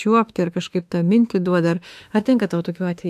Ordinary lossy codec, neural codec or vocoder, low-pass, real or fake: AAC, 96 kbps; autoencoder, 48 kHz, 32 numbers a frame, DAC-VAE, trained on Japanese speech; 14.4 kHz; fake